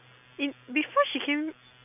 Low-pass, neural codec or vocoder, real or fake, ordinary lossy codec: 3.6 kHz; none; real; none